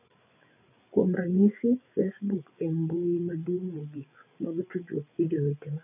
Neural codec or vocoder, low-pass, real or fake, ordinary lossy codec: codec, 16 kHz, 8 kbps, FreqCodec, larger model; 3.6 kHz; fake; none